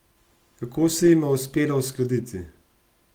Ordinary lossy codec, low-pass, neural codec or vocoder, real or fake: Opus, 24 kbps; 19.8 kHz; none; real